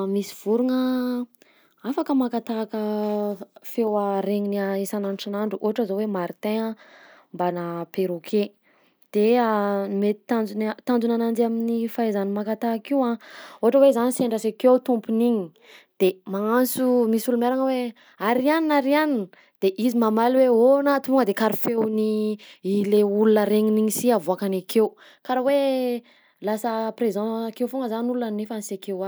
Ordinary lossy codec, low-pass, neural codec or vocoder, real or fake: none; none; none; real